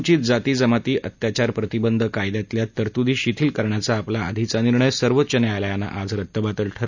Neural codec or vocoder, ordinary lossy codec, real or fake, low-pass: none; none; real; 7.2 kHz